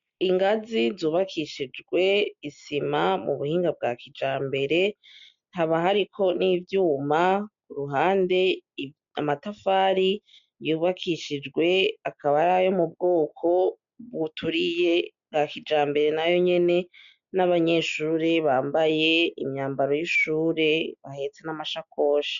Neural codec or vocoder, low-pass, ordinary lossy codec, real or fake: none; 7.2 kHz; MP3, 64 kbps; real